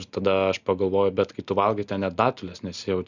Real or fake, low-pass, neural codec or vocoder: real; 7.2 kHz; none